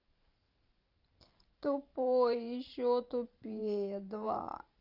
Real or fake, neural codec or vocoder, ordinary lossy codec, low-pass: fake; vocoder, 44.1 kHz, 128 mel bands every 512 samples, BigVGAN v2; Opus, 32 kbps; 5.4 kHz